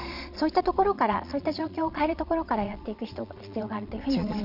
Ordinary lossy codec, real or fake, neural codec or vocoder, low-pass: none; fake; vocoder, 22.05 kHz, 80 mel bands, Vocos; 5.4 kHz